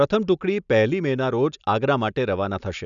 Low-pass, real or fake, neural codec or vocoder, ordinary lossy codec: 7.2 kHz; real; none; none